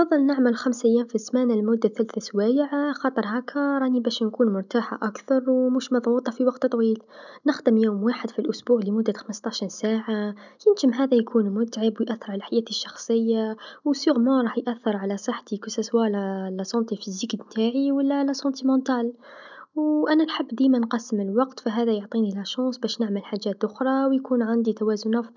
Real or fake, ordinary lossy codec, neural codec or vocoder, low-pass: real; none; none; 7.2 kHz